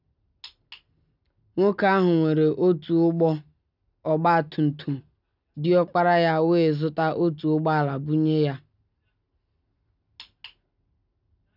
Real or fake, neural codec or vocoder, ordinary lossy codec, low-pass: real; none; none; 5.4 kHz